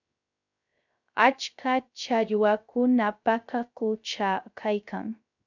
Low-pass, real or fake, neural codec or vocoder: 7.2 kHz; fake; codec, 16 kHz, 0.3 kbps, FocalCodec